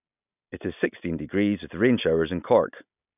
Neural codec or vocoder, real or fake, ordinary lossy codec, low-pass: none; real; none; 3.6 kHz